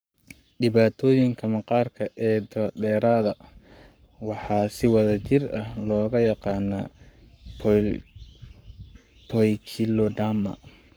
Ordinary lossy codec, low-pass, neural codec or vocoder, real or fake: none; none; codec, 44.1 kHz, 7.8 kbps, Pupu-Codec; fake